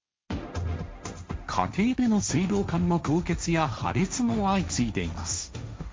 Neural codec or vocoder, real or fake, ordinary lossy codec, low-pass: codec, 16 kHz, 1.1 kbps, Voila-Tokenizer; fake; none; none